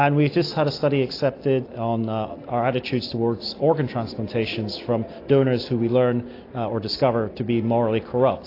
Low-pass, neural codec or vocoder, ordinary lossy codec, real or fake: 5.4 kHz; none; AAC, 32 kbps; real